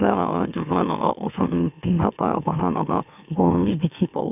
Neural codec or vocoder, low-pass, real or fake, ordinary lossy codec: autoencoder, 44.1 kHz, a latent of 192 numbers a frame, MeloTTS; 3.6 kHz; fake; none